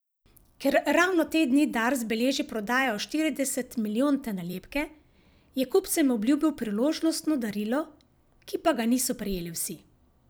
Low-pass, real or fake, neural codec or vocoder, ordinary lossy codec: none; fake; vocoder, 44.1 kHz, 128 mel bands every 256 samples, BigVGAN v2; none